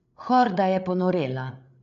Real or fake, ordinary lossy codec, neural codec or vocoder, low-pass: fake; MP3, 64 kbps; codec, 16 kHz, 8 kbps, FreqCodec, larger model; 7.2 kHz